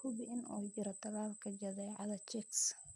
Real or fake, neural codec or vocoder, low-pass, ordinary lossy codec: real; none; none; none